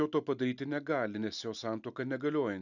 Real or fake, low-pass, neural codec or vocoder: real; 7.2 kHz; none